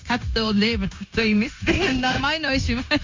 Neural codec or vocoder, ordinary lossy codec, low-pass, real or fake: codec, 16 kHz, 0.9 kbps, LongCat-Audio-Codec; MP3, 48 kbps; 7.2 kHz; fake